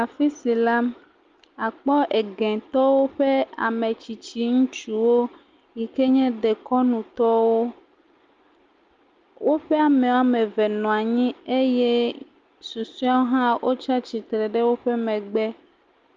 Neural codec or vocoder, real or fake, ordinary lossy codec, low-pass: none; real; Opus, 32 kbps; 7.2 kHz